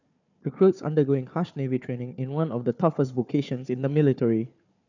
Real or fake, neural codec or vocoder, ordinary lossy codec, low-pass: fake; codec, 16 kHz, 4 kbps, FunCodec, trained on Chinese and English, 50 frames a second; none; 7.2 kHz